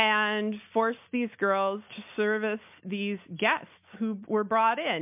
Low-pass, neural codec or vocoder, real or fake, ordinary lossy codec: 3.6 kHz; none; real; MP3, 32 kbps